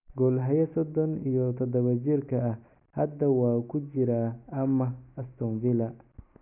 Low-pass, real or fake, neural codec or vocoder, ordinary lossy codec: 3.6 kHz; real; none; none